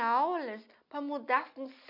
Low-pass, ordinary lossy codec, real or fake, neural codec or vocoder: 5.4 kHz; none; real; none